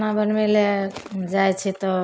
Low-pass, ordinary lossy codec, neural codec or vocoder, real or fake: none; none; none; real